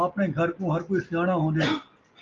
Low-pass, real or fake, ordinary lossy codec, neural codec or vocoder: 7.2 kHz; real; Opus, 32 kbps; none